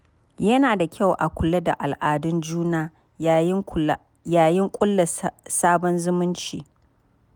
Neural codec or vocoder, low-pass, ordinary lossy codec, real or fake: none; none; none; real